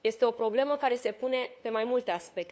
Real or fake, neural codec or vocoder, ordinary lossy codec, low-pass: fake; codec, 16 kHz, 2 kbps, FunCodec, trained on LibriTTS, 25 frames a second; none; none